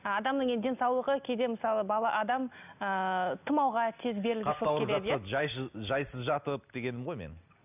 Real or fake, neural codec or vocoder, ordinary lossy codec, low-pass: real; none; none; 3.6 kHz